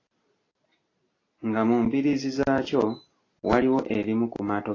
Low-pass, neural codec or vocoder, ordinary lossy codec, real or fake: 7.2 kHz; none; AAC, 32 kbps; real